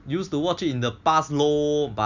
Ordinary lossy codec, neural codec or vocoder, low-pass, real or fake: none; none; 7.2 kHz; real